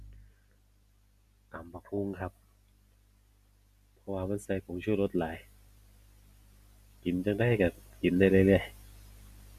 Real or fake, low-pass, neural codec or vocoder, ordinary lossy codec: real; 14.4 kHz; none; none